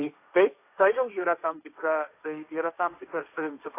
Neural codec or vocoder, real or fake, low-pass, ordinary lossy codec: codec, 16 kHz, 1.1 kbps, Voila-Tokenizer; fake; 3.6 kHz; AAC, 24 kbps